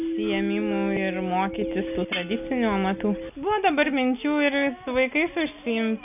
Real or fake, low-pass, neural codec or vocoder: real; 3.6 kHz; none